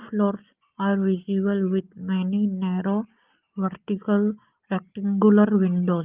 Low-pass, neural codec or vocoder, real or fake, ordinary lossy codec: 3.6 kHz; none; real; Opus, 32 kbps